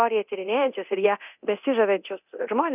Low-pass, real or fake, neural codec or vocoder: 3.6 kHz; fake; codec, 24 kHz, 0.9 kbps, DualCodec